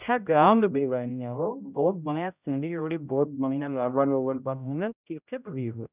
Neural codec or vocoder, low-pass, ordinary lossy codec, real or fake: codec, 16 kHz, 0.5 kbps, X-Codec, HuBERT features, trained on general audio; 3.6 kHz; none; fake